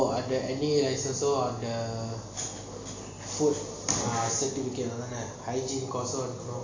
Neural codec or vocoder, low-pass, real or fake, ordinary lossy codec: none; 7.2 kHz; real; AAC, 32 kbps